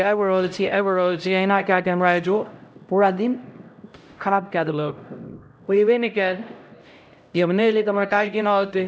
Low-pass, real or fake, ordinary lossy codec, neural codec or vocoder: none; fake; none; codec, 16 kHz, 0.5 kbps, X-Codec, HuBERT features, trained on LibriSpeech